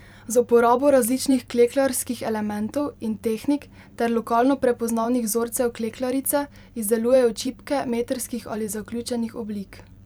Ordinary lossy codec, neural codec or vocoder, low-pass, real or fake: none; vocoder, 44.1 kHz, 128 mel bands every 512 samples, BigVGAN v2; 19.8 kHz; fake